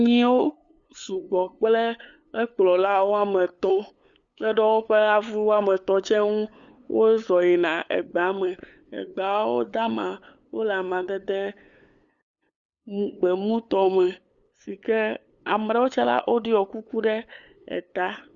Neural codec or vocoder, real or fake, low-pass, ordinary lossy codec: codec, 16 kHz, 8 kbps, FunCodec, trained on LibriTTS, 25 frames a second; fake; 7.2 kHz; Opus, 64 kbps